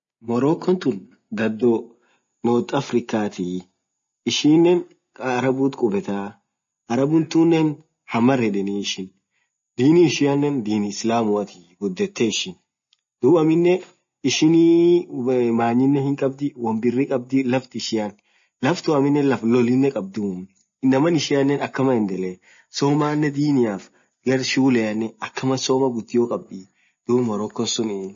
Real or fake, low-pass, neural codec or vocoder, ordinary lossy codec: real; 7.2 kHz; none; MP3, 32 kbps